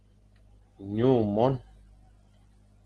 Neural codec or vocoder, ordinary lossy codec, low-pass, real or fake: none; Opus, 16 kbps; 10.8 kHz; real